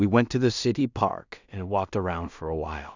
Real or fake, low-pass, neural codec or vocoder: fake; 7.2 kHz; codec, 16 kHz in and 24 kHz out, 0.4 kbps, LongCat-Audio-Codec, two codebook decoder